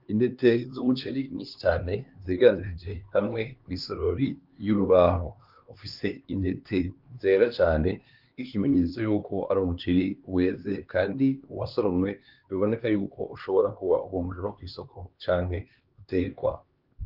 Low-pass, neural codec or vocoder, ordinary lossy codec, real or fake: 5.4 kHz; codec, 16 kHz, 2 kbps, X-Codec, HuBERT features, trained on LibriSpeech; Opus, 32 kbps; fake